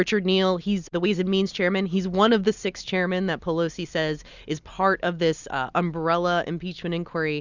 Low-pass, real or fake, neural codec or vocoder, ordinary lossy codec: 7.2 kHz; real; none; Opus, 64 kbps